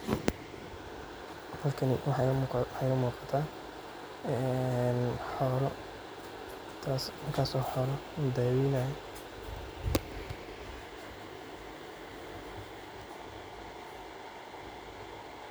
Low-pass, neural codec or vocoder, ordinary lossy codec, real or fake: none; none; none; real